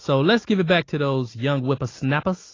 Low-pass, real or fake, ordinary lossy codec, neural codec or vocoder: 7.2 kHz; real; AAC, 32 kbps; none